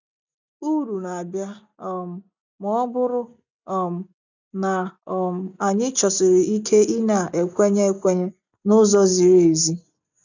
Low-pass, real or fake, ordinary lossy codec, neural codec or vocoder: 7.2 kHz; real; none; none